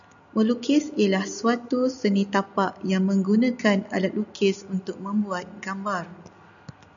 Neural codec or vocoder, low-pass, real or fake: none; 7.2 kHz; real